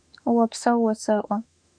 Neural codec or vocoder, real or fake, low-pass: autoencoder, 48 kHz, 32 numbers a frame, DAC-VAE, trained on Japanese speech; fake; 9.9 kHz